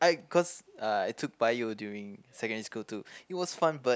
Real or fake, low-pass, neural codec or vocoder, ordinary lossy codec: real; none; none; none